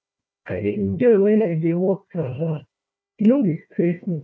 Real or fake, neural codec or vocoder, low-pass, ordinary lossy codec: fake; codec, 16 kHz, 1 kbps, FunCodec, trained on Chinese and English, 50 frames a second; none; none